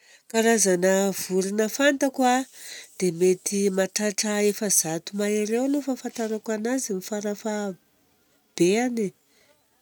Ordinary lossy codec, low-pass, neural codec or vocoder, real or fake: none; none; none; real